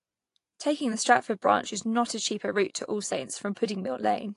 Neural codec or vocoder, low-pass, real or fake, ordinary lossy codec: vocoder, 24 kHz, 100 mel bands, Vocos; 10.8 kHz; fake; AAC, 48 kbps